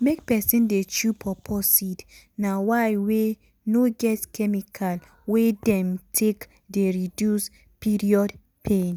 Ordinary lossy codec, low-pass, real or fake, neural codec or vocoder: none; none; real; none